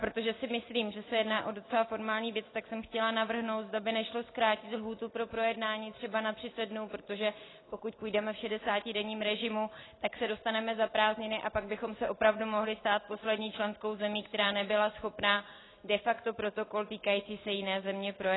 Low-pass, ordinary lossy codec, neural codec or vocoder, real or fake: 7.2 kHz; AAC, 16 kbps; none; real